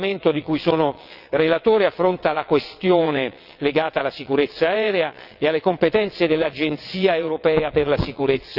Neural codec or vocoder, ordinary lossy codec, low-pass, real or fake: vocoder, 22.05 kHz, 80 mel bands, WaveNeXt; Opus, 64 kbps; 5.4 kHz; fake